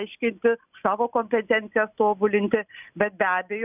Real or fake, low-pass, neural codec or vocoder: real; 3.6 kHz; none